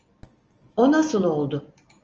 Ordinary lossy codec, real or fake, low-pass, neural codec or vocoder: Opus, 24 kbps; real; 7.2 kHz; none